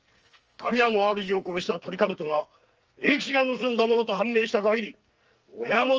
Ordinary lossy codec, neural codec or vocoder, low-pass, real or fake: Opus, 24 kbps; codec, 44.1 kHz, 2.6 kbps, SNAC; 7.2 kHz; fake